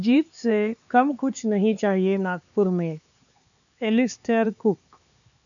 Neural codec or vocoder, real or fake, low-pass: codec, 16 kHz, 4 kbps, X-Codec, HuBERT features, trained on LibriSpeech; fake; 7.2 kHz